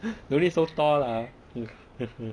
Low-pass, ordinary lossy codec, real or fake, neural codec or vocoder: 9.9 kHz; none; real; none